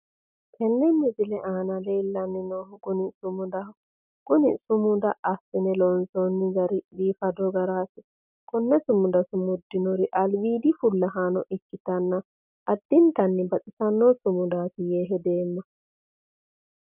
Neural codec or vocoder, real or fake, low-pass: none; real; 3.6 kHz